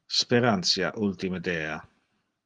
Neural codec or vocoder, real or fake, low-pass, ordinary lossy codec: none; real; 9.9 kHz; Opus, 24 kbps